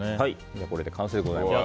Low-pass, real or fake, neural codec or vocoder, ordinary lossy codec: none; real; none; none